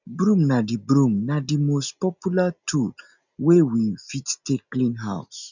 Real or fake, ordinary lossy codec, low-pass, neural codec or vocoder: real; none; 7.2 kHz; none